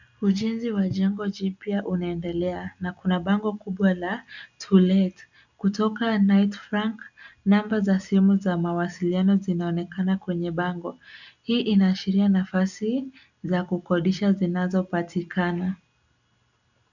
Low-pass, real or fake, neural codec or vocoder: 7.2 kHz; real; none